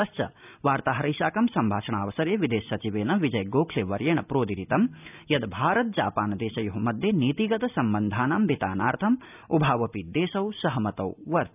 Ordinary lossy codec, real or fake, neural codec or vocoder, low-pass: none; real; none; 3.6 kHz